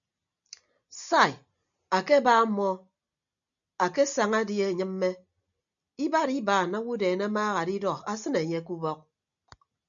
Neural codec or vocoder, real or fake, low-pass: none; real; 7.2 kHz